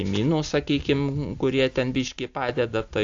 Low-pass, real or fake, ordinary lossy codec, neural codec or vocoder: 7.2 kHz; real; AAC, 64 kbps; none